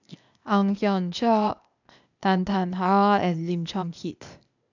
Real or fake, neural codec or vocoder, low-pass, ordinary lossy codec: fake; codec, 16 kHz, 0.8 kbps, ZipCodec; 7.2 kHz; none